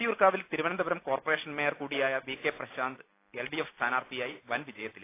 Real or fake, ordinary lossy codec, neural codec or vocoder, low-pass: real; AAC, 24 kbps; none; 3.6 kHz